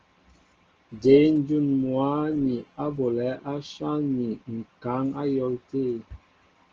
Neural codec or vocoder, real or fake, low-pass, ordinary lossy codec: none; real; 7.2 kHz; Opus, 16 kbps